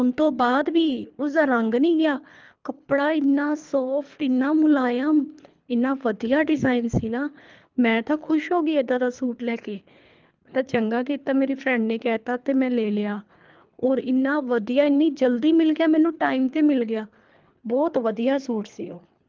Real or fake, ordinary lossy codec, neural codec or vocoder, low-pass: fake; Opus, 24 kbps; codec, 24 kHz, 3 kbps, HILCodec; 7.2 kHz